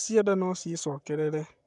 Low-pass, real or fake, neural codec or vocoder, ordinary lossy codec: 10.8 kHz; fake; codec, 44.1 kHz, 7.8 kbps, Pupu-Codec; none